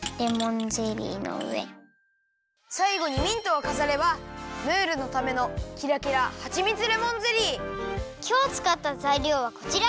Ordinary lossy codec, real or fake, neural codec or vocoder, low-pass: none; real; none; none